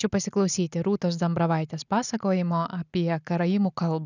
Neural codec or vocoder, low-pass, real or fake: none; 7.2 kHz; real